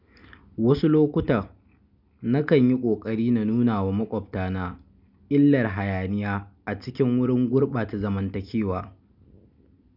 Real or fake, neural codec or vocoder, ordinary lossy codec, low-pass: real; none; none; 5.4 kHz